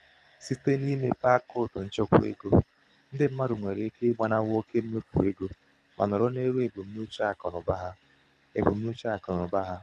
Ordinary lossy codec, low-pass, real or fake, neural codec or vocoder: none; none; fake; codec, 24 kHz, 6 kbps, HILCodec